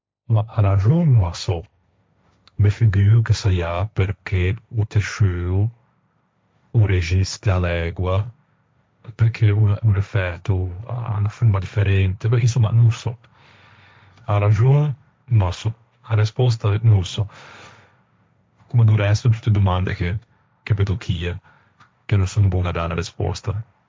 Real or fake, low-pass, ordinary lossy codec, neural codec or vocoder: fake; none; none; codec, 16 kHz, 1.1 kbps, Voila-Tokenizer